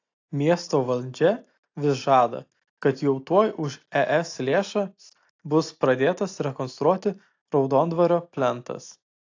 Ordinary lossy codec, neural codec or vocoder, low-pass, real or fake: AAC, 48 kbps; none; 7.2 kHz; real